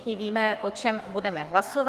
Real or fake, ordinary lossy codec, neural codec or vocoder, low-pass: fake; Opus, 24 kbps; codec, 32 kHz, 1.9 kbps, SNAC; 14.4 kHz